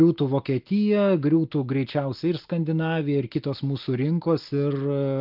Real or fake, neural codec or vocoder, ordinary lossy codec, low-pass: real; none; Opus, 32 kbps; 5.4 kHz